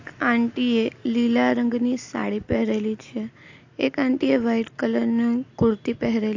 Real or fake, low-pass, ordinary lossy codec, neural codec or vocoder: real; 7.2 kHz; AAC, 48 kbps; none